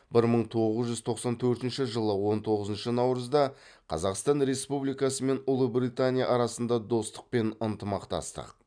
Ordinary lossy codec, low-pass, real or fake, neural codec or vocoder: none; 9.9 kHz; real; none